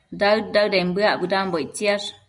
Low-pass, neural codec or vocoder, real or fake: 10.8 kHz; none; real